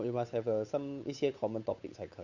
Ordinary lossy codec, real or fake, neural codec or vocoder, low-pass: none; fake; codec, 16 kHz, 8 kbps, FunCodec, trained on Chinese and English, 25 frames a second; 7.2 kHz